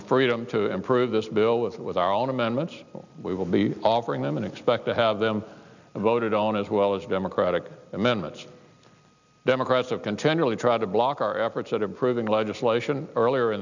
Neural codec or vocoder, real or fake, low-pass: none; real; 7.2 kHz